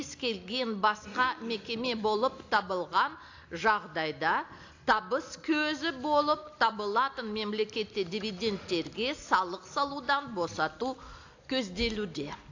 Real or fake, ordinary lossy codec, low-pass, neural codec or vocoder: real; AAC, 48 kbps; 7.2 kHz; none